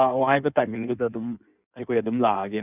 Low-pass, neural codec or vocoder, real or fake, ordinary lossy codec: 3.6 kHz; codec, 16 kHz in and 24 kHz out, 1.1 kbps, FireRedTTS-2 codec; fake; none